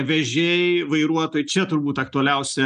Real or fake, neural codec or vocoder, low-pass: real; none; 9.9 kHz